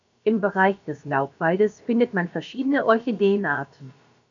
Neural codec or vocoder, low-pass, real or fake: codec, 16 kHz, about 1 kbps, DyCAST, with the encoder's durations; 7.2 kHz; fake